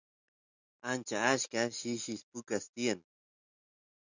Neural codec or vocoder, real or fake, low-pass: none; real; 7.2 kHz